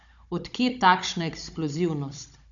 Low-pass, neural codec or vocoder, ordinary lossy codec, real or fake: 7.2 kHz; codec, 16 kHz, 16 kbps, FunCodec, trained on Chinese and English, 50 frames a second; none; fake